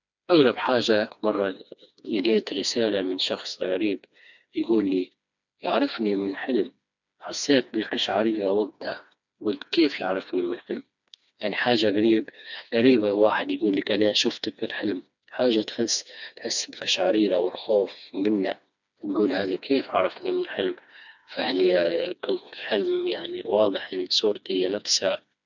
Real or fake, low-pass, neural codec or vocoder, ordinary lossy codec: fake; 7.2 kHz; codec, 16 kHz, 2 kbps, FreqCodec, smaller model; none